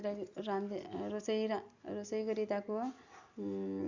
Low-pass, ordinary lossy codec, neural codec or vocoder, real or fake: 7.2 kHz; Opus, 64 kbps; none; real